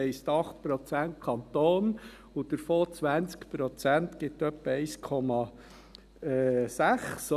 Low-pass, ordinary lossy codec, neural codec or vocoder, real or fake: 14.4 kHz; none; none; real